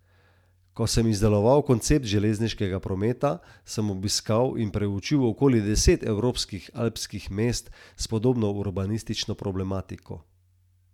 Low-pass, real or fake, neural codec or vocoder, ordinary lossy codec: 19.8 kHz; real; none; none